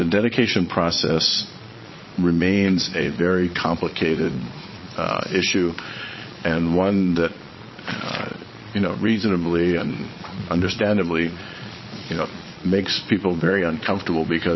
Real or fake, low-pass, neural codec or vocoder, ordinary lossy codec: fake; 7.2 kHz; vocoder, 22.05 kHz, 80 mel bands, Vocos; MP3, 24 kbps